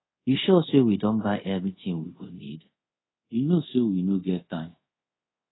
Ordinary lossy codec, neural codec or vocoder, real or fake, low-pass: AAC, 16 kbps; codec, 24 kHz, 0.5 kbps, DualCodec; fake; 7.2 kHz